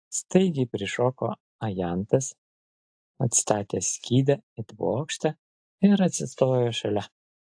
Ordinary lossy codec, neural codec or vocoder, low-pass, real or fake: AAC, 64 kbps; none; 9.9 kHz; real